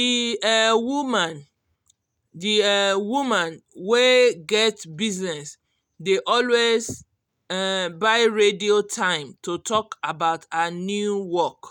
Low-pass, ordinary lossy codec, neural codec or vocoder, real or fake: 19.8 kHz; none; none; real